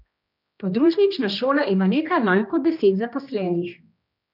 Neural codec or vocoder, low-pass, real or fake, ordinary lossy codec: codec, 16 kHz, 1 kbps, X-Codec, HuBERT features, trained on general audio; 5.4 kHz; fake; none